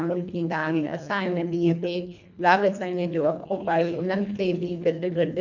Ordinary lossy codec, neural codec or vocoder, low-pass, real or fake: none; codec, 24 kHz, 1.5 kbps, HILCodec; 7.2 kHz; fake